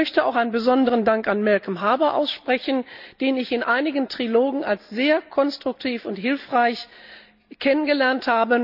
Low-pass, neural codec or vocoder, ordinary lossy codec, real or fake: 5.4 kHz; none; none; real